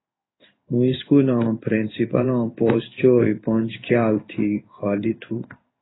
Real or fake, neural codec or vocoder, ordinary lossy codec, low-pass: fake; codec, 16 kHz in and 24 kHz out, 1 kbps, XY-Tokenizer; AAC, 16 kbps; 7.2 kHz